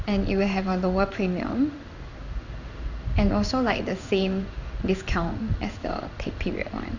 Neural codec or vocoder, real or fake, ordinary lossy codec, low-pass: codec, 16 kHz in and 24 kHz out, 1 kbps, XY-Tokenizer; fake; none; 7.2 kHz